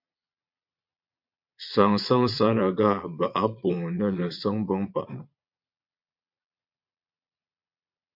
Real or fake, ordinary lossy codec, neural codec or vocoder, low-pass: fake; MP3, 48 kbps; vocoder, 22.05 kHz, 80 mel bands, Vocos; 5.4 kHz